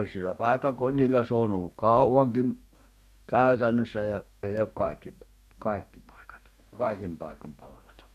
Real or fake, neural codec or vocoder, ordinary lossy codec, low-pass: fake; codec, 44.1 kHz, 2.6 kbps, DAC; none; 14.4 kHz